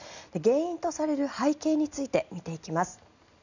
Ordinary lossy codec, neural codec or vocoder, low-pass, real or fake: none; none; 7.2 kHz; real